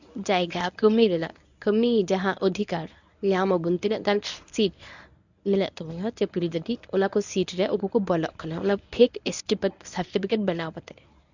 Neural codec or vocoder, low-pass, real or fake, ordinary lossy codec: codec, 24 kHz, 0.9 kbps, WavTokenizer, medium speech release version 1; 7.2 kHz; fake; none